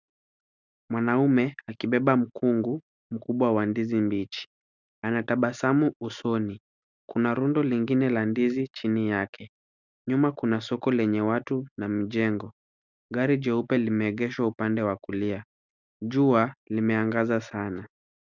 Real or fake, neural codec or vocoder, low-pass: real; none; 7.2 kHz